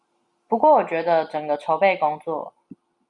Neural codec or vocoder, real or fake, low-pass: none; real; 10.8 kHz